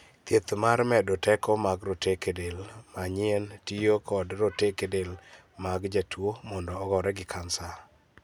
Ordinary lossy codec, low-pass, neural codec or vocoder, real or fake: none; 19.8 kHz; none; real